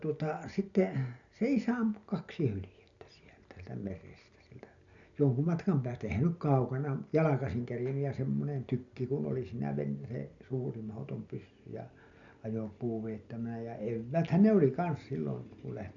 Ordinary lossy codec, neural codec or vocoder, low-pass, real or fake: none; none; 7.2 kHz; real